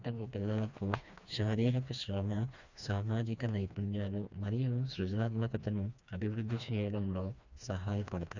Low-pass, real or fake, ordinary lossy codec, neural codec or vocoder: 7.2 kHz; fake; Opus, 64 kbps; codec, 16 kHz, 2 kbps, FreqCodec, smaller model